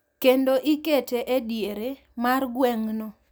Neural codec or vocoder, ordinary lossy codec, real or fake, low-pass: none; none; real; none